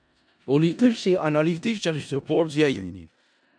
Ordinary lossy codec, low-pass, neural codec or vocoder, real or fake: MP3, 96 kbps; 9.9 kHz; codec, 16 kHz in and 24 kHz out, 0.4 kbps, LongCat-Audio-Codec, four codebook decoder; fake